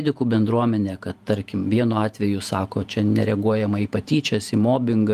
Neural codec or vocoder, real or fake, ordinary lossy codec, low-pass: vocoder, 48 kHz, 128 mel bands, Vocos; fake; Opus, 32 kbps; 14.4 kHz